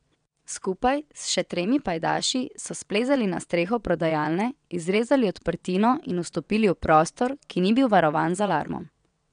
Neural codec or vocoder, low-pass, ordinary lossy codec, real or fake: vocoder, 22.05 kHz, 80 mel bands, WaveNeXt; 9.9 kHz; none; fake